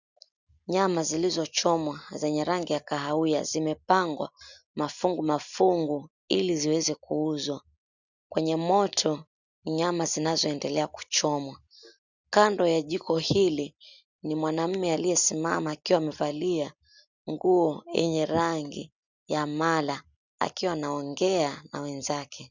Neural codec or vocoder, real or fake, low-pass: none; real; 7.2 kHz